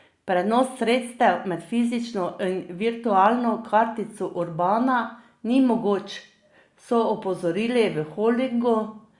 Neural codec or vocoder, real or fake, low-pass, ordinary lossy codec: none; real; 10.8 kHz; Opus, 64 kbps